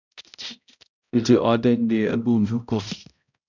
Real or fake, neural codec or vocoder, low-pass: fake; codec, 16 kHz, 0.5 kbps, X-Codec, HuBERT features, trained on balanced general audio; 7.2 kHz